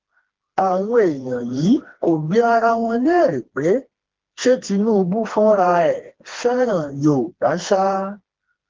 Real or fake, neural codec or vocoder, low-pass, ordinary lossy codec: fake; codec, 16 kHz, 2 kbps, FreqCodec, smaller model; 7.2 kHz; Opus, 16 kbps